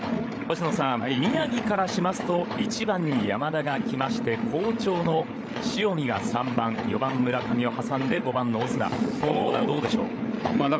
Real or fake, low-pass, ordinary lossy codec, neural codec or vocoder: fake; none; none; codec, 16 kHz, 8 kbps, FreqCodec, larger model